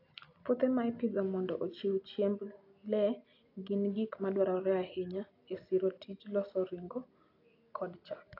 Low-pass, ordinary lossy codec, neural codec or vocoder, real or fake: 5.4 kHz; AAC, 32 kbps; none; real